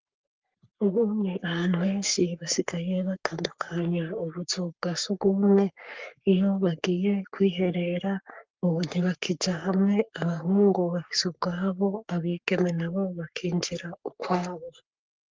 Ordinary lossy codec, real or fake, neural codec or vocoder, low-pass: Opus, 32 kbps; fake; codec, 44.1 kHz, 3.4 kbps, Pupu-Codec; 7.2 kHz